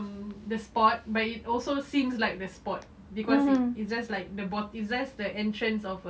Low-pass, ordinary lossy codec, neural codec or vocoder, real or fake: none; none; none; real